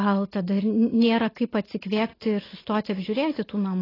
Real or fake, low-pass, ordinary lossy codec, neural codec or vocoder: real; 5.4 kHz; AAC, 24 kbps; none